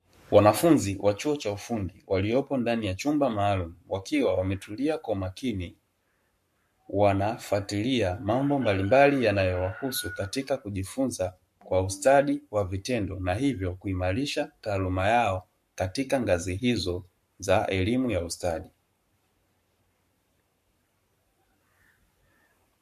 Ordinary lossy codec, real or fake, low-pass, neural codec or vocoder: MP3, 64 kbps; fake; 14.4 kHz; codec, 44.1 kHz, 7.8 kbps, Pupu-Codec